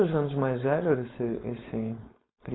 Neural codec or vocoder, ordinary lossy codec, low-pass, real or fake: codec, 16 kHz, 4.8 kbps, FACodec; AAC, 16 kbps; 7.2 kHz; fake